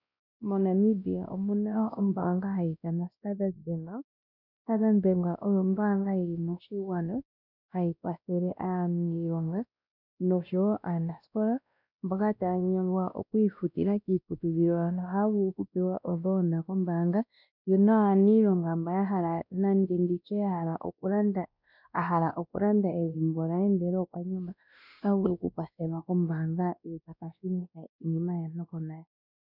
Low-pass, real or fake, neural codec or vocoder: 5.4 kHz; fake; codec, 16 kHz, 1 kbps, X-Codec, WavLM features, trained on Multilingual LibriSpeech